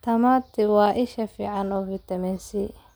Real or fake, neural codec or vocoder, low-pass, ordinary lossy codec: real; none; none; none